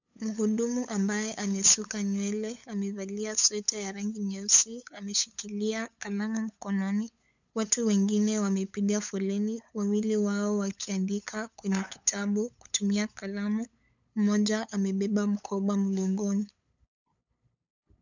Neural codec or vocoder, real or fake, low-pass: codec, 16 kHz, 8 kbps, FunCodec, trained on LibriTTS, 25 frames a second; fake; 7.2 kHz